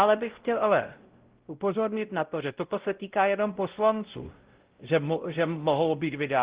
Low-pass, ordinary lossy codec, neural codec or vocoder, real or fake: 3.6 kHz; Opus, 16 kbps; codec, 16 kHz, 0.5 kbps, X-Codec, WavLM features, trained on Multilingual LibriSpeech; fake